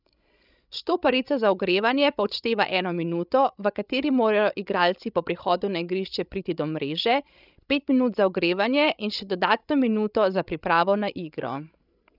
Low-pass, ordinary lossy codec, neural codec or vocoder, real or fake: 5.4 kHz; none; codec, 16 kHz, 16 kbps, FreqCodec, larger model; fake